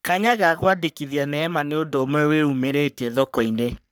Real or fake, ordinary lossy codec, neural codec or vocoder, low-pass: fake; none; codec, 44.1 kHz, 3.4 kbps, Pupu-Codec; none